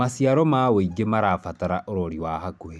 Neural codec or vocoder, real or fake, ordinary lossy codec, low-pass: none; real; none; none